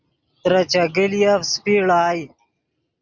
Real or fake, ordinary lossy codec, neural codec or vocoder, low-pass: real; Opus, 64 kbps; none; 7.2 kHz